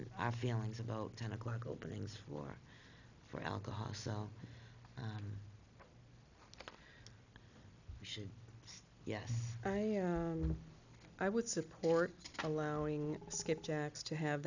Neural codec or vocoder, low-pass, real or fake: none; 7.2 kHz; real